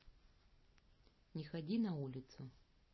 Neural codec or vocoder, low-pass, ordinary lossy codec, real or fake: none; 7.2 kHz; MP3, 24 kbps; real